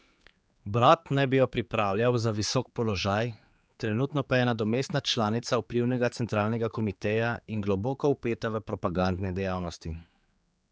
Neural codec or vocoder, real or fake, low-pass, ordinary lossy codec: codec, 16 kHz, 4 kbps, X-Codec, HuBERT features, trained on general audio; fake; none; none